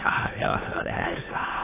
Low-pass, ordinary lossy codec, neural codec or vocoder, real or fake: 3.6 kHz; AAC, 16 kbps; autoencoder, 22.05 kHz, a latent of 192 numbers a frame, VITS, trained on many speakers; fake